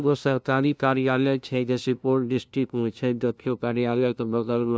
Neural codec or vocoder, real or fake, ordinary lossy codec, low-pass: codec, 16 kHz, 0.5 kbps, FunCodec, trained on LibriTTS, 25 frames a second; fake; none; none